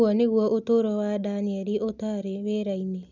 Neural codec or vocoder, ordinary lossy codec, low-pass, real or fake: none; none; 7.2 kHz; real